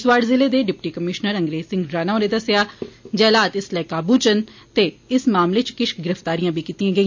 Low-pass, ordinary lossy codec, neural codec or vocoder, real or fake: 7.2 kHz; MP3, 48 kbps; none; real